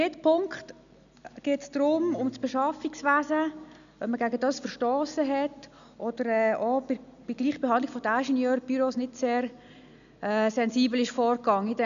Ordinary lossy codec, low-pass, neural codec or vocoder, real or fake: none; 7.2 kHz; none; real